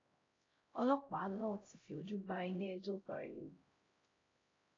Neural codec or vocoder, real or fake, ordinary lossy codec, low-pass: codec, 16 kHz, 0.5 kbps, X-Codec, HuBERT features, trained on LibriSpeech; fake; AAC, 32 kbps; 7.2 kHz